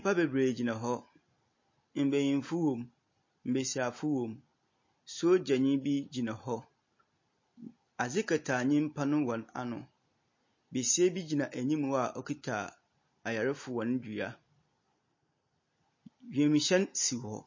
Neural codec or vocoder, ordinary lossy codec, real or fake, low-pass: none; MP3, 32 kbps; real; 7.2 kHz